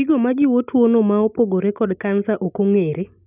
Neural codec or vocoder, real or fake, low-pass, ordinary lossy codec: none; real; 3.6 kHz; none